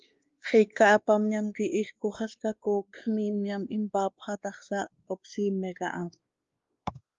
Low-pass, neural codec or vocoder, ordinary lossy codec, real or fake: 7.2 kHz; codec, 16 kHz, 2 kbps, X-Codec, WavLM features, trained on Multilingual LibriSpeech; Opus, 32 kbps; fake